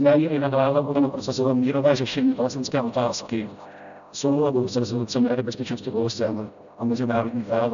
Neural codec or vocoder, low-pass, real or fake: codec, 16 kHz, 0.5 kbps, FreqCodec, smaller model; 7.2 kHz; fake